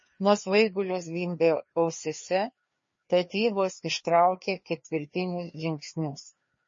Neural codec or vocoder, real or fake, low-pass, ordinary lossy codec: codec, 16 kHz, 2 kbps, FreqCodec, larger model; fake; 7.2 kHz; MP3, 32 kbps